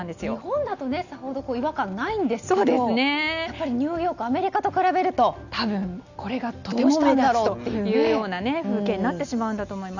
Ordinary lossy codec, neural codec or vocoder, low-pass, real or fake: none; none; 7.2 kHz; real